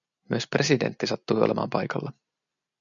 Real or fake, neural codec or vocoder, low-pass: real; none; 7.2 kHz